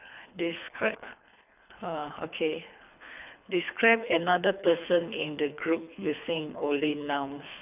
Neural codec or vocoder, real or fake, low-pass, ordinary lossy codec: codec, 24 kHz, 3 kbps, HILCodec; fake; 3.6 kHz; none